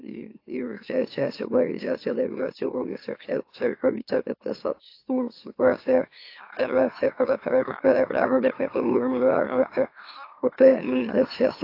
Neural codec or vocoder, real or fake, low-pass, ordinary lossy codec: autoencoder, 44.1 kHz, a latent of 192 numbers a frame, MeloTTS; fake; 5.4 kHz; AAC, 32 kbps